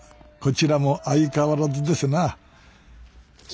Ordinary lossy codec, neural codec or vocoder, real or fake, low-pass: none; none; real; none